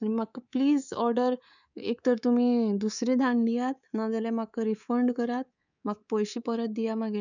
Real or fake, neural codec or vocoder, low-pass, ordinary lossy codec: fake; codec, 24 kHz, 3.1 kbps, DualCodec; 7.2 kHz; none